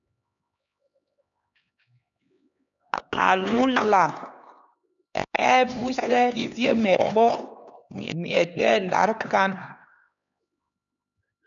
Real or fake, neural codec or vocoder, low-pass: fake; codec, 16 kHz, 2 kbps, X-Codec, HuBERT features, trained on LibriSpeech; 7.2 kHz